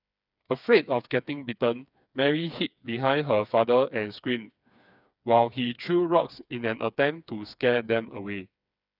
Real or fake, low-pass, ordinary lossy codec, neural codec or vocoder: fake; 5.4 kHz; none; codec, 16 kHz, 4 kbps, FreqCodec, smaller model